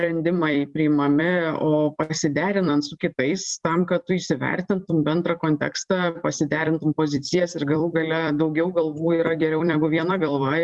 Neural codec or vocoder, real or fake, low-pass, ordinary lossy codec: vocoder, 44.1 kHz, 128 mel bands every 256 samples, BigVGAN v2; fake; 10.8 kHz; Opus, 64 kbps